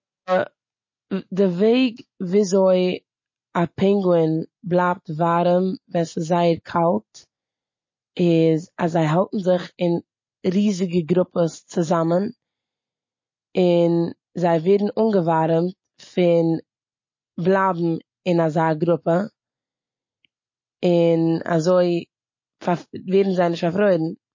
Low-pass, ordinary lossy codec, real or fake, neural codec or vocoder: 7.2 kHz; MP3, 32 kbps; real; none